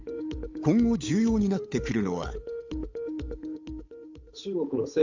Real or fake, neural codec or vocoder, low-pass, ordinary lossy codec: fake; codec, 16 kHz, 8 kbps, FunCodec, trained on Chinese and English, 25 frames a second; 7.2 kHz; AAC, 48 kbps